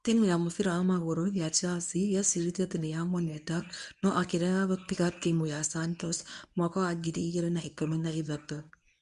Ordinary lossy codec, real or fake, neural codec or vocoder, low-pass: none; fake; codec, 24 kHz, 0.9 kbps, WavTokenizer, medium speech release version 1; 10.8 kHz